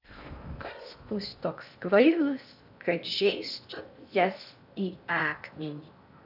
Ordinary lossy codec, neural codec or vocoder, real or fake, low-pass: AAC, 48 kbps; codec, 16 kHz in and 24 kHz out, 0.6 kbps, FocalCodec, streaming, 4096 codes; fake; 5.4 kHz